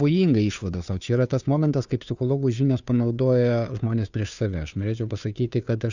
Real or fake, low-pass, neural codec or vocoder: fake; 7.2 kHz; codec, 16 kHz, 2 kbps, FunCodec, trained on Chinese and English, 25 frames a second